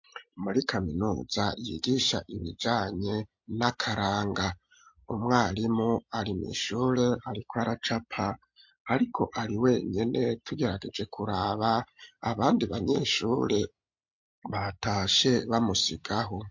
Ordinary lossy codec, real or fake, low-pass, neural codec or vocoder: MP3, 48 kbps; real; 7.2 kHz; none